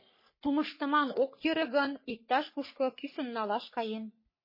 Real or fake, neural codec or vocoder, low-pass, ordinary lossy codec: fake; codec, 44.1 kHz, 3.4 kbps, Pupu-Codec; 5.4 kHz; MP3, 24 kbps